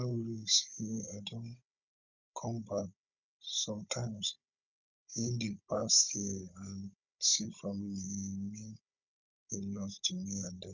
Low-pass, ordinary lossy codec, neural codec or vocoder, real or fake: 7.2 kHz; none; codec, 16 kHz, 16 kbps, FunCodec, trained on Chinese and English, 50 frames a second; fake